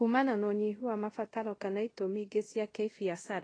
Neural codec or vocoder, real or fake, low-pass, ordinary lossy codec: codec, 24 kHz, 0.5 kbps, DualCodec; fake; 9.9 kHz; AAC, 32 kbps